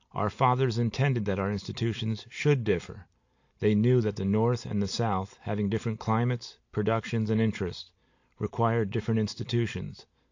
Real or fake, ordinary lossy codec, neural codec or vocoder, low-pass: real; AAC, 48 kbps; none; 7.2 kHz